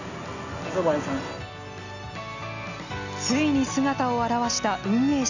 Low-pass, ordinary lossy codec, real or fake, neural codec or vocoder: 7.2 kHz; none; real; none